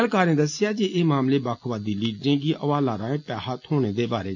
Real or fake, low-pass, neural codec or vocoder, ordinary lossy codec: real; 7.2 kHz; none; none